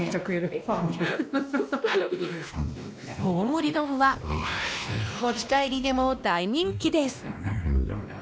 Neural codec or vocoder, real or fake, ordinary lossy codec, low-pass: codec, 16 kHz, 1 kbps, X-Codec, WavLM features, trained on Multilingual LibriSpeech; fake; none; none